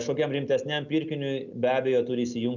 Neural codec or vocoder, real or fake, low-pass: none; real; 7.2 kHz